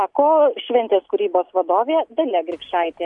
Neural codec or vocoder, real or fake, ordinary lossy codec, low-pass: none; real; AAC, 64 kbps; 10.8 kHz